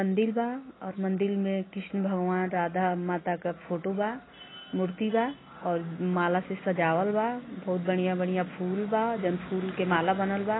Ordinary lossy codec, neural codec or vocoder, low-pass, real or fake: AAC, 16 kbps; none; 7.2 kHz; real